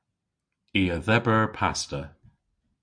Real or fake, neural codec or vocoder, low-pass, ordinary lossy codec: real; none; 9.9 kHz; AAC, 64 kbps